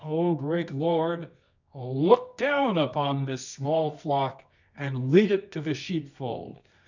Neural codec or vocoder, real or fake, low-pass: codec, 24 kHz, 0.9 kbps, WavTokenizer, medium music audio release; fake; 7.2 kHz